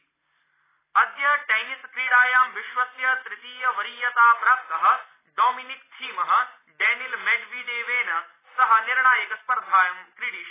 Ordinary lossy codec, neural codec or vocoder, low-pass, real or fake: AAC, 16 kbps; none; 3.6 kHz; real